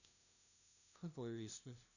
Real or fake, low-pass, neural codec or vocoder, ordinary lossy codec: fake; 7.2 kHz; codec, 16 kHz, 0.5 kbps, FunCodec, trained on LibriTTS, 25 frames a second; none